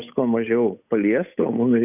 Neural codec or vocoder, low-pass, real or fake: codec, 16 kHz, 8 kbps, FunCodec, trained on Chinese and English, 25 frames a second; 3.6 kHz; fake